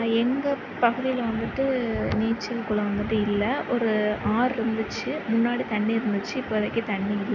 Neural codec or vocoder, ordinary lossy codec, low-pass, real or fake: none; none; 7.2 kHz; real